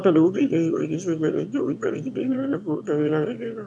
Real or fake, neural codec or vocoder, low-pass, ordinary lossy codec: fake; autoencoder, 22.05 kHz, a latent of 192 numbers a frame, VITS, trained on one speaker; none; none